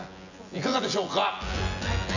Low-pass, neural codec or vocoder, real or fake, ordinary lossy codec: 7.2 kHz; vocoder, 24 kHz, 100 mel bands, Vocos; fake; none